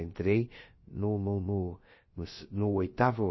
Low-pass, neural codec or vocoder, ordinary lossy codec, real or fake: 7.2 kHz; codec, 16 kHz, about 1 kbps, DyCAST, with the encoder's durations; MP3, 24 kbps; fake